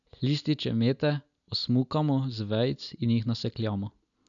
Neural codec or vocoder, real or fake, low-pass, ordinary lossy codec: none; real; 7.2 kHz; none